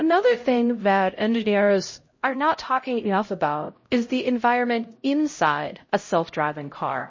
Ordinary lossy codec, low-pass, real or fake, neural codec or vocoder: MP3, 32 kbps; 7.2 kHz; fake; codec, 16 kHz, 0.5 kbps, X-Codec, HuBERT features, trained on LibriSpeech